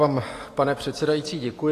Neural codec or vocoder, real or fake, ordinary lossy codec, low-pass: none; real; AAC, 48 kbps; 14.4 kHz